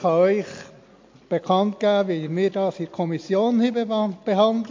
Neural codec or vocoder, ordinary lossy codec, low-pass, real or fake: none; MP3, 48 kbps; 7.2 kHz; real